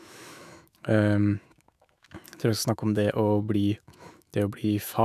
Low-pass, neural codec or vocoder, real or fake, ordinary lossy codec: 14.4 kHz; autoencoder, 48 kHz, 128 numbers a frame, DAC-VAE, trained on Japanese speech; fake; none